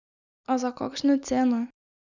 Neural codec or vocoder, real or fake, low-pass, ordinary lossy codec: none; real; 7.2 kHz; none